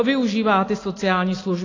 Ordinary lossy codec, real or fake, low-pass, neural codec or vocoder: AAC, 32 kbps; real; 7.2 kHz; none